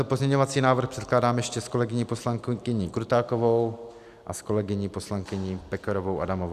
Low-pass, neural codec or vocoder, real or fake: 14.4 kHz; none; real